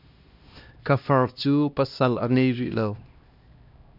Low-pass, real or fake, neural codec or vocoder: 5.4 kHz; fake; codec, 16 kHz, 1 kbps, X-Codec, HuBERT features, trained on LibriSpeech